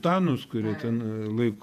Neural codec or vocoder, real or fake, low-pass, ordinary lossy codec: vocoder, 48 kHz, 128 mel bands, Vocos; fake; 14.4 kHz; MP3, 96 kbps